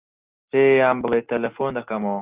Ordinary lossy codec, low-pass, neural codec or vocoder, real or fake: Opus, 24 kbps; 3.6 kHz; none; real